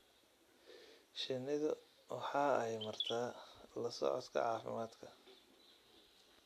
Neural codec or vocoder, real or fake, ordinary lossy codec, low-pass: none; real; AAC, 96 kbps; 14.4 kHz